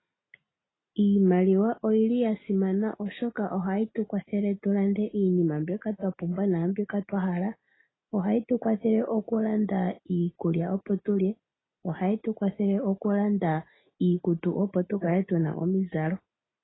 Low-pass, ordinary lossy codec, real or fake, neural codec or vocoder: 7.2 kHz; AAC, 16 kbps; real; none